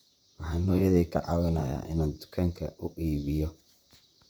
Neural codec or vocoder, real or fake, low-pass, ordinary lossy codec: vocoder, 44.1 kHz, 128 mel bands, Pupu-Vocoder; fake; none; none